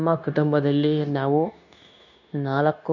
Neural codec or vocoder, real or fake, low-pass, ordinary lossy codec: codec, 16 kHz, 0.9 kbps, LongCat-Audio-Codec; fake; 7.2 kHz; none